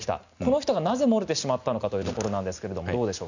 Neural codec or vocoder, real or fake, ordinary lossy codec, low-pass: none; real; none; 7.2 kHz